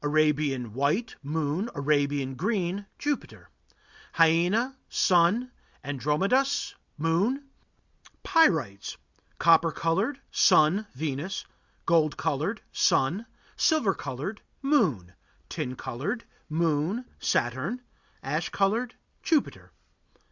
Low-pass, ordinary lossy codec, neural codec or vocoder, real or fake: 7.2 kHz; Opus, 64 kbps; none; real